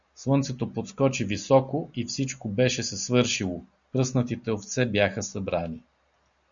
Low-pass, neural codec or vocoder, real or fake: 7.2 kHz; none; real